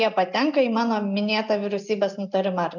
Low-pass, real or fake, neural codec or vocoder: 7.2 kHz; real; none